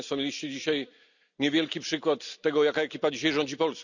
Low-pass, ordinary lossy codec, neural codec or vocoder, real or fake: 7.2 kHz; none; none; real